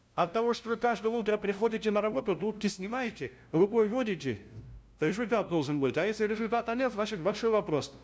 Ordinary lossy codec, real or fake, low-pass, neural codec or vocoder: none; fake; none; codec, 16 kHz, 0.5 kbps, FunCodec, trained on LibriTTS, 25 frames a second